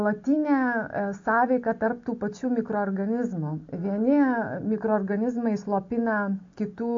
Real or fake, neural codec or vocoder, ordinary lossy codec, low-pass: real; none; AAC, 48 kbps; 7.2 kHz